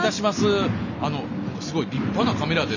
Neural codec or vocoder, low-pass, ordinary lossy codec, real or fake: none; 7.2 kHz; none; real